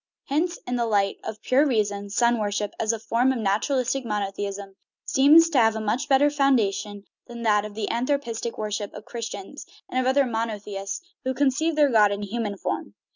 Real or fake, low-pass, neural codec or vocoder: real; 7.2 kHz; none